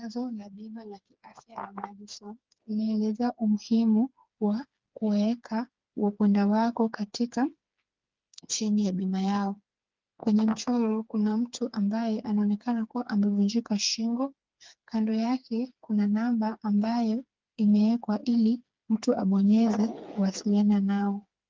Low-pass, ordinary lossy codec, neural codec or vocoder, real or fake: 7.2 kHz; Opus, 32 kbps; codec, 16 kHz, 4 kbps, FreqCodec, smaller model; fake